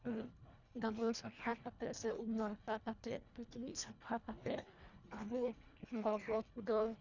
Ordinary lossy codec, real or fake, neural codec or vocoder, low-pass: none; fake; codec, 24 kHz, 1.5 kbps, HILCodec; 7.2 kHz